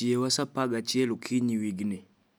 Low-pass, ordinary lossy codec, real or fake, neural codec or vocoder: none; none; real; none